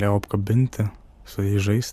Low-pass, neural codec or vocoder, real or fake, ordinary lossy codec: 14.4 kHz; none; real; MP3, 96 kbps